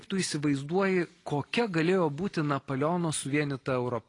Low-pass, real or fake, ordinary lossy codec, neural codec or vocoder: 10.8 kHz; real; AAC, 32 kbps; none